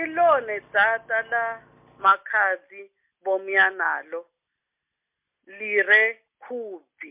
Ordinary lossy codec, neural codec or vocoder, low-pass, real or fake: MP3, 32 kbps; none; 3.6 kHz; real